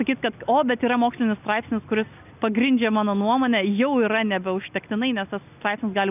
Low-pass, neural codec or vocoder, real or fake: 3.6 kHz; none; real